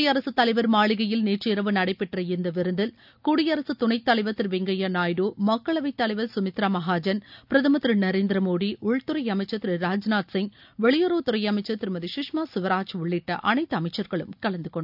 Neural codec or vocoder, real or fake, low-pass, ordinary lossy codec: none; real; 5.4 kHz; none